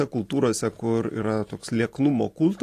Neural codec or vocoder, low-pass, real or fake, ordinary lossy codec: none; 14.4 kHz; real; AAC, 48 kbps